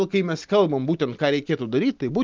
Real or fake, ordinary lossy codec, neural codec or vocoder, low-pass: real; Opus, 24 kbps; none; 7.2 kHz